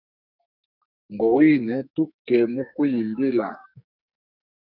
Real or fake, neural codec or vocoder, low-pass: fake; codec, 44.1 kHz, 2.6 kbps, SNAC; 5.4 kHz